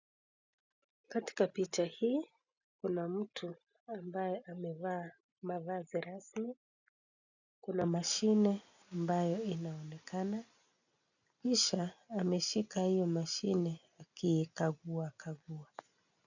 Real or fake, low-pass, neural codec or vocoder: real; 7.2 kHz; none